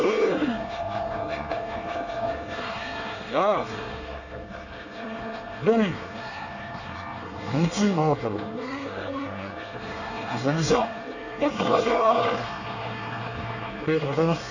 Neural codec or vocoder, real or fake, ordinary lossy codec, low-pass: codec, 24 kHz, 1 kbps, SNAC; fake; none; 7.2 kHz